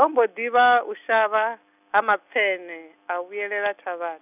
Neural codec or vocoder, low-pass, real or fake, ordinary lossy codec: none; 3.6 kHz; real; none